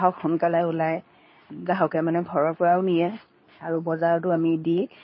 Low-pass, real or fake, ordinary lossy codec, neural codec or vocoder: 7.2 kHz; fake; MP3, 24 kbps; codec, 24 kHz, 0.9 kbps, WavTokenizer, medium speech release version 2